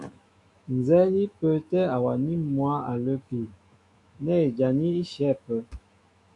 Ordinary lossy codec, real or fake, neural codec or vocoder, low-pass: MP3, 96 kbps; fake; autoencoder, 48 kHz, 128 numbers a frame, DAC-VAE, trained on Japanese speech; 10.8 kHz